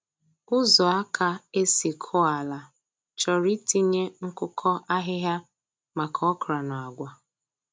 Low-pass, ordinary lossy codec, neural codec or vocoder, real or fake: none; none; none; real